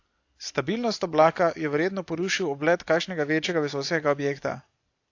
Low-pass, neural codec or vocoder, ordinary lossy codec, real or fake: 7.2 kHz; none; AAC, 48 kbps; real